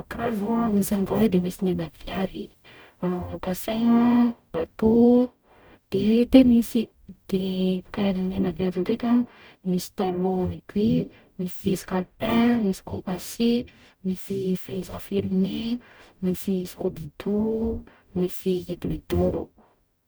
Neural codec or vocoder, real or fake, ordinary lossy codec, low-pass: codec, 44.1 kHz, 0.9 kbps, DAC; fake; none; none